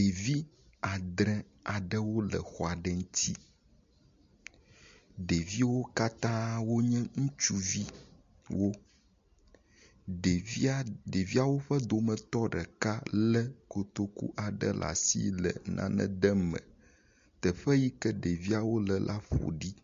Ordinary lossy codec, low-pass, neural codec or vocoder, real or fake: MP3, 48 kbps; 7.2 kHz; none; real